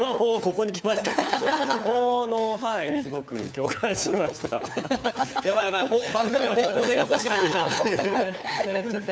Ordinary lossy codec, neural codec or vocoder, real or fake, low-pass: none; codec, 16 kHz, 4 kbps, FunCodec, trained on LibriTTS, 50 frames a second; fake; none